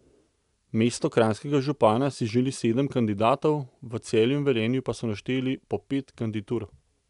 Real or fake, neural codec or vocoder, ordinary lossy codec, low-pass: real; none; none; 10.8 kHz